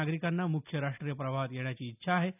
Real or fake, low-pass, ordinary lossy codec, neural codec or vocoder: real; 3.6 kHz; none; none